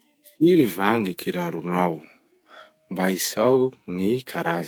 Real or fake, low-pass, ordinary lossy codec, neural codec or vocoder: fake; none; none; codec, 44.1 kHz, 2.6 kbps, SNAC